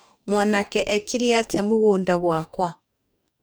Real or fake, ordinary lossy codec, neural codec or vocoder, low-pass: fake; none; codec, 44.1 kHz, 2.6 kbps, DAC; none